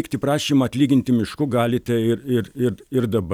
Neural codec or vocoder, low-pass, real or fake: none; 19.8 kHz; real